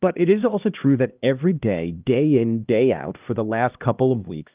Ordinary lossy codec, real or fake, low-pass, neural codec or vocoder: Opus, 24 kbps; fake; 3.6 kHz; codec, 16 kHz, 1 kbps, X-Codec, HuBERT features, trained on LibriSpeech